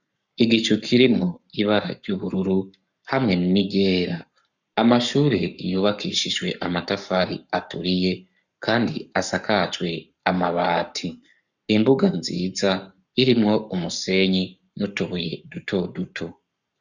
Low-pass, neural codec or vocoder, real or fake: 7.2 kHz; codec, 44.1 kHz, 7.8 kbps, Pupu-Codec; fake